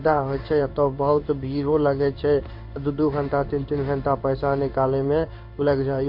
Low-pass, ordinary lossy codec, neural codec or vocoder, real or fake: 5.4 kHz; MP3, 32 kbps; codec, 16 kHz in and 24 kHz out, 1 kbps, XY-Tokenizer; fake